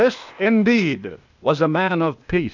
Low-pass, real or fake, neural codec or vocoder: 7.2 kHz; fake; codec, 16 kHz, 0.8 kbps, ZipCodec